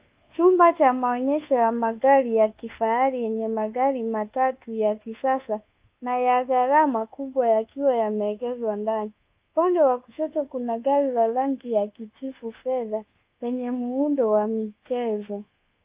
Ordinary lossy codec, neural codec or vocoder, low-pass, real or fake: Opus, 32 kbps; codec, 24 kHz, 1.2 kbps, DualCodec; 3.6 kHz; fake